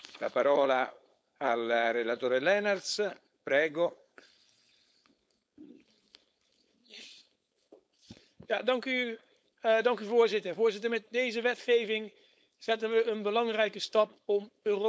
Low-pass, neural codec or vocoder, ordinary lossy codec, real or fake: none; codec, 16 kHz, 4.8 kbps, FACodec; none; fake